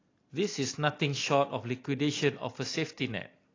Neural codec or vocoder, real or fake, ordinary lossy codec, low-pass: none; real; AAC, 32 kbps; 7.2 kHz